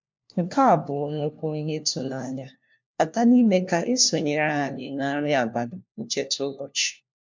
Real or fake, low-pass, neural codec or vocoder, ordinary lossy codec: fake; 7.2 kHz; codec, 16 kHz, 1 kbps, FunCodec, trained on LibriTTS, 50 frames a second; MP3, 64 kbps